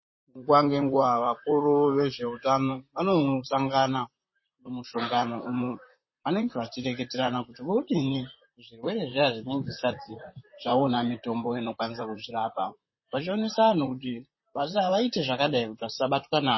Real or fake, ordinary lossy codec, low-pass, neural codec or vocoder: fake; MP3, 24 kbps; 7.2 kHz; vocoder, 44.1 kHz, 128 mel bands, Pupu-Vocoder